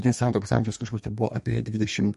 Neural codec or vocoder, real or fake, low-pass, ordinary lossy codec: codec, 32 kHz, 1.9 kbps, SNAC; fake; 14.4 kHz; MP3, 48 kbps